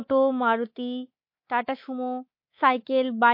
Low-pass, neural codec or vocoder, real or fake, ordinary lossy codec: 5.4 kHz; codec, 24 kHz, 3.1 kbps, DualCodec; fake; MP3, 32 kbps